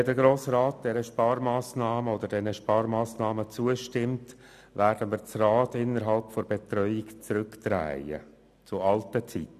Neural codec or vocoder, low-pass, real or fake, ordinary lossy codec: none; 14.4 kHz; real; none